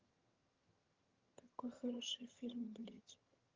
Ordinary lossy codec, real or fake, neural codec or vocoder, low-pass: Opus, 32 kbps; fake; vocoder, 22.05 kHz, 80 mel bands, HiFi-GAN; 7.2 kHz